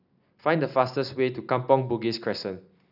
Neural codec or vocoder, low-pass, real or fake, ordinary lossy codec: none; 5.4 kHz; real; none